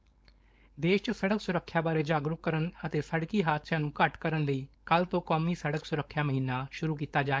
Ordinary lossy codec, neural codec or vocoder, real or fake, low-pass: none; codec, 16 kHz, 4.8 kbps, FACodec; fake; none